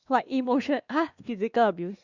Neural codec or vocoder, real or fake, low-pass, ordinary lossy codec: codec, 16 kHz, 2 kbps, X-Codec, WavLM features, trained on Multilingual LibriSpeech; fake; 7.2 kHz; Opus, 64 kbps